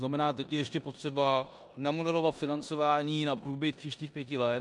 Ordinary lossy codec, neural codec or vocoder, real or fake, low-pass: MP3, 64 kbps; codec, 16 kHz in and 24 kHz out, 0.9 kbps, LongCat-Audio-Codec, four codebook decoder; fake; 10.8 kHz